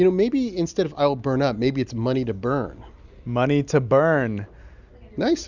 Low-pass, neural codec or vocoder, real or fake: 7.2 kHz; none; real